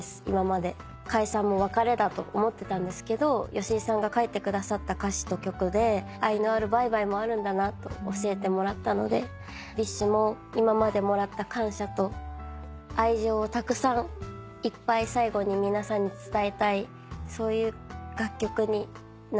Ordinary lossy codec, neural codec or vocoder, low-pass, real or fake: none; none; none; real